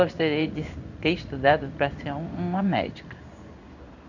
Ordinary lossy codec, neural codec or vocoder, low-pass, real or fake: none; codec, 16 kHz in and 24 kHz out, 1 kbps, XY-Tokenizer; 7.2 kHz; fake